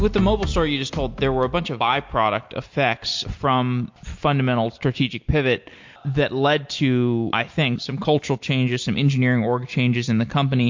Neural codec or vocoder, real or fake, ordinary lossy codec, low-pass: none; real; MP3, 48 kbps; 7.2 kHz